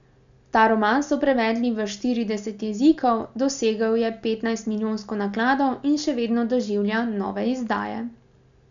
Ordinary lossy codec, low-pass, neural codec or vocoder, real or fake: none; 7.2 kHz; none; real